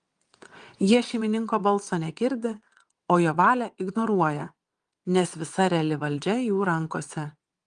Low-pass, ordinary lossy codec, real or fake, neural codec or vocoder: 9.9 kHz; Opus, 32 kbps; fake; vocoder, 22.05 kHz, 80 mel bands, WaveNeXt